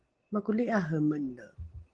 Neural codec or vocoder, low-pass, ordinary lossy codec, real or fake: none; 9.9 kHz; Opus, 16 kbps; real